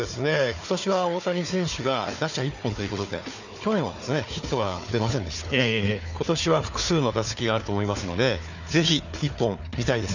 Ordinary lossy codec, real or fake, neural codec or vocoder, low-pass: none; fake; codec, 16 kHz, 4 kbps, FunCodec, trained on Chinese and English, 50 frames a second; 7.2 kHz